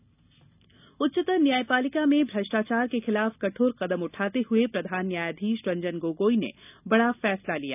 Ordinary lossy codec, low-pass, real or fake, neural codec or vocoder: none; 3.6 kHz; real; none